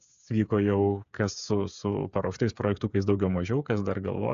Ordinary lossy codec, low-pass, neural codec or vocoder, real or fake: AAC, 96 kbps; 7.2 kHz; codec, 16 kHz, 8 kbps, FreqCodec, smaller model; fake